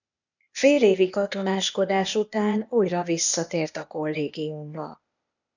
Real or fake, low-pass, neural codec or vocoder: fake; 7.2 kHz; codec, 16 kHz, 0.8 kbps, ZipCodec